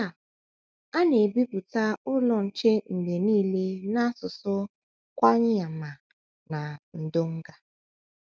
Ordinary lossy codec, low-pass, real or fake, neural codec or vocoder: none; none; real; none